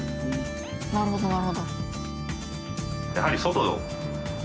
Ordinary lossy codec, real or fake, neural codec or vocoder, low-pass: none; real; none; none